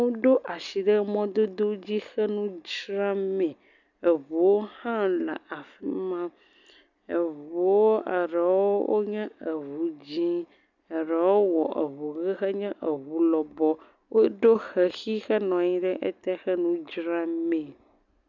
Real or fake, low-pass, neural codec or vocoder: real; 7.2 kHz; none